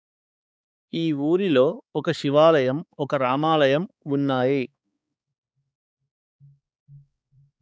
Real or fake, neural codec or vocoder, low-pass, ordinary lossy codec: fake; codec, 16 kHz, 4 kbps, X-Codec, HuBERT features, trained on balanced general audio; none; none